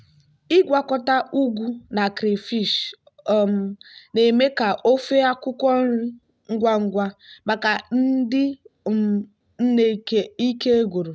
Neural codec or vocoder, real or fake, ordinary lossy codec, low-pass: none; real; none; none